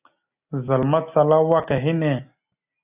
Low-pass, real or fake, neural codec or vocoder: 3.6 kHz; real; none